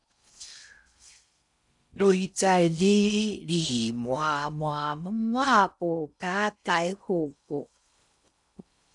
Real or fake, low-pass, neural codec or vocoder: fake; 10.8 kHz; codec, 16 kHz in and 24 kHz out, 0.6 kbps, FocalCodec, streaming, 4096 codes